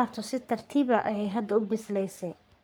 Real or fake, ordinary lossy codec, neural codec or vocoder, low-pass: fake; none; codec, 44.1 kHz, 3.4 kbps, Pupu-Codec; none